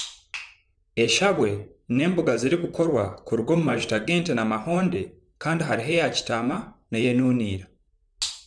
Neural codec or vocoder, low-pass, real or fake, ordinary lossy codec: vocoder, 44.1 kHz, 128 mel bands, Pupu-Vocoder; 9.9 kHz; fake; none